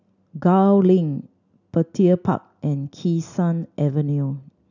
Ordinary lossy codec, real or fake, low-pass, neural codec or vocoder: none; real; 7.2 kHz; none